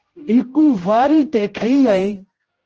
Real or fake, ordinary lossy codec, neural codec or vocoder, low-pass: fake; Opus, 16 kbps; codec, 16 kHz, 0.5 kbps, X-Codec, HuBERT features, trained on general audio; 7.2 kHz